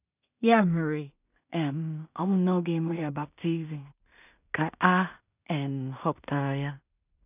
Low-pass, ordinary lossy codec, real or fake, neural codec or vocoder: 3.6 kHz; none; fake; codec, 16 kHz in and 24 kHz out, 0.4 kbps, LongCat-Audio-Codec, two codebook decoder